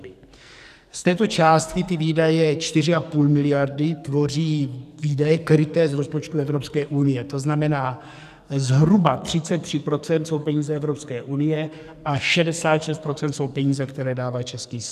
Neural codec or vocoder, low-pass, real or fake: codec, 44.1 kHz, 2.6 kbps, SNAC; 14.4 kHz; fake